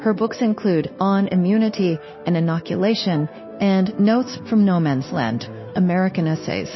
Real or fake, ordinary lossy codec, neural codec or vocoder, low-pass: fake; MP3, 24 kbps; codec, 16 kHz, 0.9 kbps, LongCat-Audio-Codec; 7.2 kHz